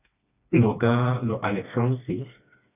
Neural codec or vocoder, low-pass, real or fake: codec, 16 kHz, 2 kbps, FreqCodec, smaller model; 3.6 kHz; fake